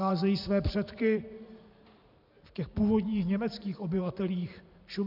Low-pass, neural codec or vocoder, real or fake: 5.4 kHz; vocoder, 44.1 kHz, 128 mel bands every 512 samples, BigVGAN v2; fake